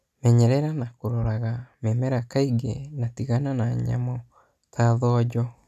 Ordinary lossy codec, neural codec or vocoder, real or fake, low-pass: none; none; real; 14.4 kHz